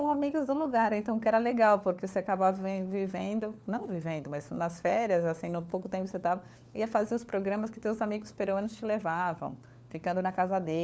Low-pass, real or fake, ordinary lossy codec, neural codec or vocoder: none; fake; none; codec, 16 kHz, 4 kbps, FunCodec, trained on LibriTTS, 50 frames a second